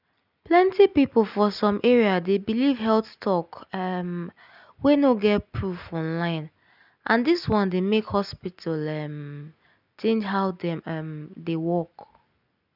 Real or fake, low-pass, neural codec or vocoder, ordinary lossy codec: real; 5.4 kHz; none; none